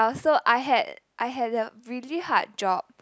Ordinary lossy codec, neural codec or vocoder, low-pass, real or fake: none; none; none; real